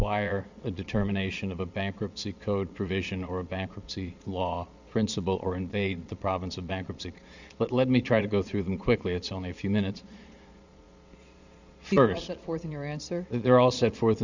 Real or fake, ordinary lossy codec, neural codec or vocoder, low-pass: fake; Opus, 64 kbps; vocoder, 22.05 kHz, 80 mel bands, Vocos; 7.2 kHz